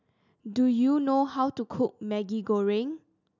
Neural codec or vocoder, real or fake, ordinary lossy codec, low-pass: none; real; none; 7.2 kHz